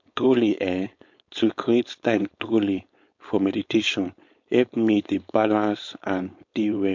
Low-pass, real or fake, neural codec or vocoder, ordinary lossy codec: 7.2 kHz; fake; codec, 16 kHz, 4.8 kbps, FACodec; MP3, 48 kbps